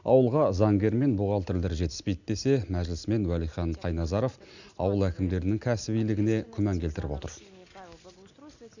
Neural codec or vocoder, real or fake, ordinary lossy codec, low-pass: none; real; none; 7.2 kHz